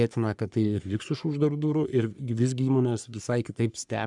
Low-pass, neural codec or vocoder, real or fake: 10.8 kHz; codec, 44.1 kHz, 3.4 kbps, Pupu-Codec; fake